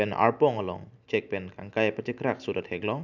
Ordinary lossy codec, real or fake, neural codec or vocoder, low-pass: none; real; none; 7.2 kHz